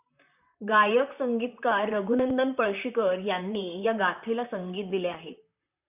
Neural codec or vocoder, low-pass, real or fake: vocoder, 44.1 kHz, 128 mel bands, Pupu-Vocoder; 3.6 kHz; fake